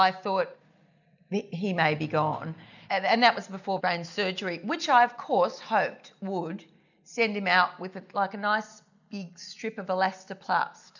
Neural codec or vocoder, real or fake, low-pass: none; real; 7.2 kHz